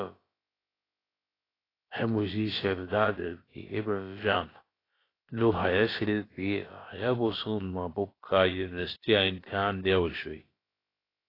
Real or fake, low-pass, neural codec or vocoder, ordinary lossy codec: fake; 5.4 kHz; codec, 16 kHz, about 1 kbps, DyCAST, with the encoder's durations; AAC, 24 kbps